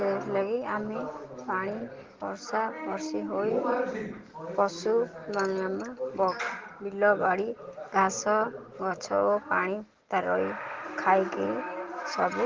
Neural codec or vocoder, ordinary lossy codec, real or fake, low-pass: none; Opus, 16 kbps; real; 7.2 kHz